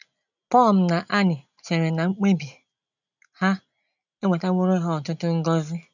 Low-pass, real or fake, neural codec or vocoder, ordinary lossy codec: 7.2 kHz; real; none; none